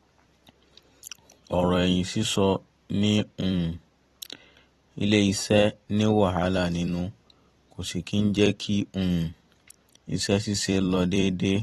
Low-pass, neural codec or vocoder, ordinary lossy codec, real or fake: 19.8 kHz; vocoder, 44.1 kHz, 128 mel bands every 256 samples, BigVGAN v2; AAC, 32 kbps; fake